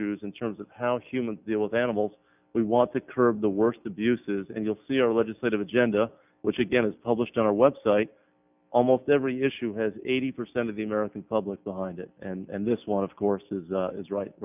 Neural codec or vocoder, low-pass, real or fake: none; 3.6 kHz; real